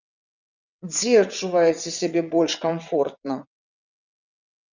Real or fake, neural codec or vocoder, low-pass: fake; vocoder, 22.05 kHz, 80 mel bands, WaveNeXt; 7.2 kHz